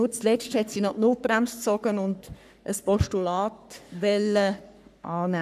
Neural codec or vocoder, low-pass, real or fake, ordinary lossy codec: codec, 44.1 kHz, 3.4 kbps, Pupu-Codec; 14.4 kHz; fake; none